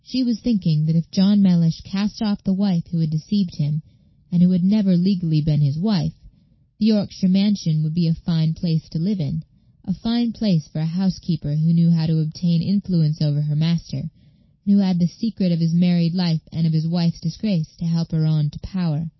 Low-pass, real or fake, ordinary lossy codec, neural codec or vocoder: 7.2 kHz; real; MP3, 24 kbps; none